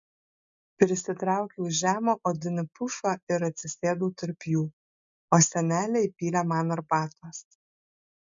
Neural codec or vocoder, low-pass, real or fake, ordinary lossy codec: none; 7.2 kHz; real; MP3, 64 kbps